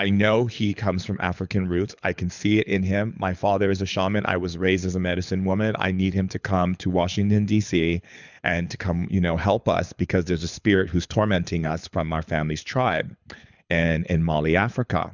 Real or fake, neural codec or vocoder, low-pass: fake; codec, 24 kHz, 6 kbps, HILCodec; 7.2 kHz